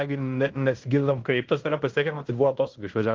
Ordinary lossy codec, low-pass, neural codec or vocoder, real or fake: Opus, 32 kbps; 7.2 kHz; codec, 16 kHz in and 24 kHz out, 0.9 kbps, LongCat-Audio-Codec, fine tuned four codebook decoder; fake